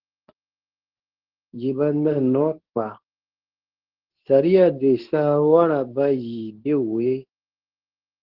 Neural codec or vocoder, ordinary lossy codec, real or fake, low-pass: codec, 24 kHz, 0.9 kbps, WavTokenizer, medium speech release version 2; Opus, 16 kbps; fake; 5.4 kHz